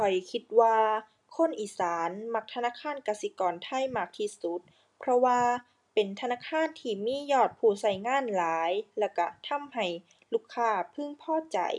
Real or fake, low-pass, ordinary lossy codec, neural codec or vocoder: real; 10.8 kHz; none; none